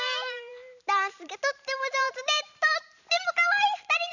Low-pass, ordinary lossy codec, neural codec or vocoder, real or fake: 7.2 kHz; none; vocoder, 44.1 kHz, 128 mel bands every 512 samples, BigVGAN v2; fake